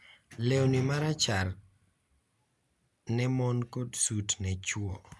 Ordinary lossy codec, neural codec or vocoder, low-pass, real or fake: none; none; none; real